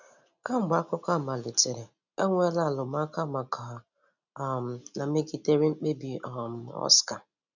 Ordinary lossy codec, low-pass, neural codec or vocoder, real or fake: none; 7.2 kHz; none; real